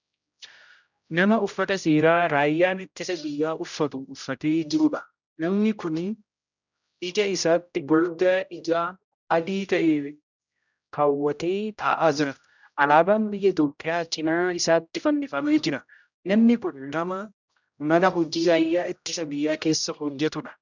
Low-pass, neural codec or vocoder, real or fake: 7.2 kHz; codec, 16 kHz, 0.5 kbps, X-Codec, HuBERT features, trained on general audio; fake